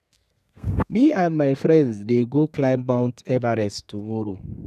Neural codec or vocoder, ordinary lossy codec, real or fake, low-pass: codec, 32 kHz, 1.9 kbps, SNAC; none; fake; 14.4 kHz